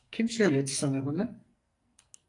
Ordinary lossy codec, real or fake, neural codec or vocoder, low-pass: AAC, 48 kbps; fake; codec, 44.1 kHz, 2.6 kbps, SNAC; 10.8 kHz